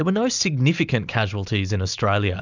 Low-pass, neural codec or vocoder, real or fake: 7.2 kHz; none; real